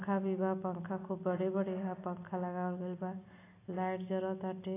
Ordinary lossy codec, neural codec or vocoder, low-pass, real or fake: AAC, 24 kbps; none; 3.6 kHz; real